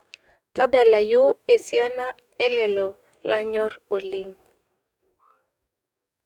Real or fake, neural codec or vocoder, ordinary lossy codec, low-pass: fake; codec, 44.1 kHz, 2.6 kbps, DAC; none; 19.8 kHz